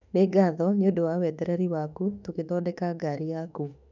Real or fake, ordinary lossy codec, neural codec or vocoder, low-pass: fake; none; autoencoder, 48 kHz, 32 numbers a frame, DAC-VAE, trained on Japanese speech; 7.2 kHz